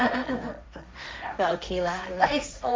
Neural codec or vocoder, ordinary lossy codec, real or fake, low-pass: codec, 16 kHz, 1.1 kbps, Voila-Tokenizer; none; fake; none